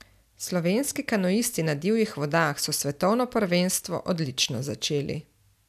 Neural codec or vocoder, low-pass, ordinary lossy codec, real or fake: none; 14.4 kHz; none; real